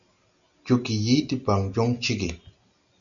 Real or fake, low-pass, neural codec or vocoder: real; 7.2 kHz; none